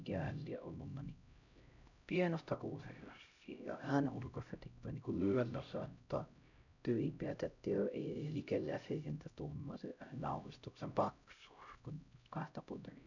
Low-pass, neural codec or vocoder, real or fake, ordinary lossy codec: 7.2 kHz; codec, 16 kHz, 0.5 kbps, X-Codec, HuBERT features, trained on LibriSpeech; fake; none